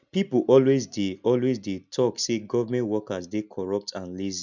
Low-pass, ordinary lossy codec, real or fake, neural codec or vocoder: 7.2 kHz; none; real; none